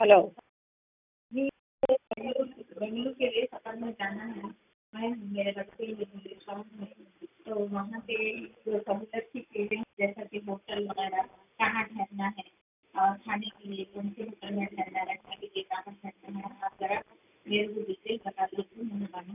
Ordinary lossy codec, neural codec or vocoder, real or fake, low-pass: none; none; real; 3.6 kHz